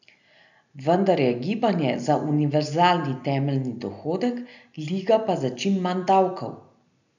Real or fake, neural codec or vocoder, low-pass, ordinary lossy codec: real; none; 7.2 kHz; none